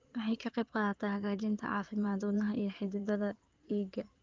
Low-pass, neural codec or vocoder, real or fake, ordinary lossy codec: 7.2 kHz; codec, 16 kHz in and 24 kHz out, 2.2 kbps, FireRedTTS-2 codec; fake; Opus, 24 kbps